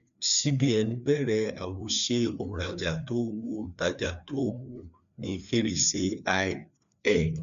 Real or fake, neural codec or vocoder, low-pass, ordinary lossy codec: fake; codec, 16 kHz, 2 kbps, FreqCodec, larger model; 7.2 kHz; none